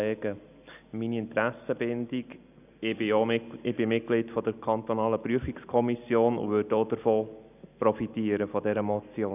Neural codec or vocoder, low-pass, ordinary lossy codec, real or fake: none; 3.6 kHz; none; real